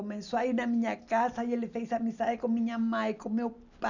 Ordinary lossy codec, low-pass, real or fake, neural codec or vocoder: none; 7.2 kHz; real; none